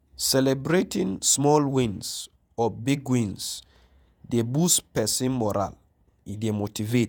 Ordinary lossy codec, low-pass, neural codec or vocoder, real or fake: none; none; vocoder, 48 kHz, 128 mel bands, Vocos; fake